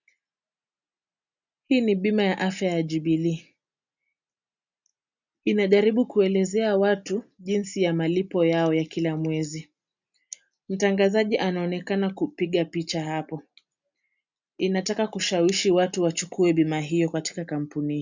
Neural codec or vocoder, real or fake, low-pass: none; real; 7.2 kHz